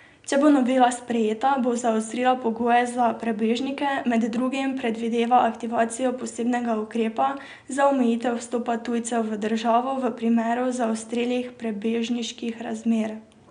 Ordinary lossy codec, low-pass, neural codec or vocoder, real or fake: none; 9.9 kHz; none; real